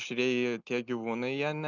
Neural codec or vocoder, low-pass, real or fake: none; 7.2 kHz; real